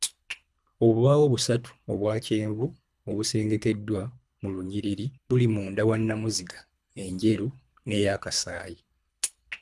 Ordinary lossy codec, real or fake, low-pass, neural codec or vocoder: none; fake; none; codec, 24 kHz, 3 kbps, HILCodec